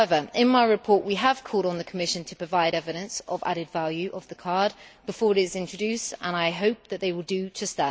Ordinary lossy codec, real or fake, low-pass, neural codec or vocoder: none; real; none; none